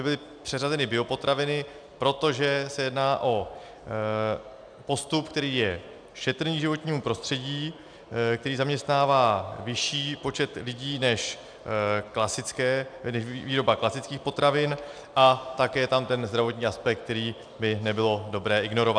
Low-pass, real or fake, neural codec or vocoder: 9.9 kHz; real; none